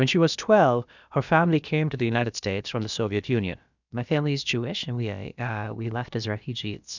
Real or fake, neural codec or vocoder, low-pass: fake; codec, 16 kHz, about 1 kbps, DyCAST, with the encoder's durations; 7.2 kHz